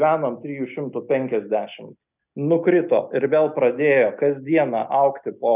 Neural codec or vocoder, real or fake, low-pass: none; real; 3.6 kHz